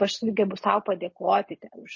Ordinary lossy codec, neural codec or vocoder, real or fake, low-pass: MP3, 32 kbps; none; real; 7.2 kHz